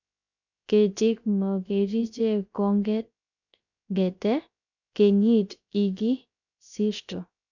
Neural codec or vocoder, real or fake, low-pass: codec, 16 kHz, 0.3 kbps, FocalCodec; fake; 7.2 kHz